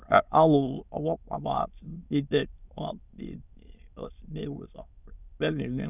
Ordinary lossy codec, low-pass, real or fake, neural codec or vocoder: none; 3.6 kHz; fake; autoencoder, 22.05 kHz, a latent of 192 numbers a frame, VITS, trained on many speakers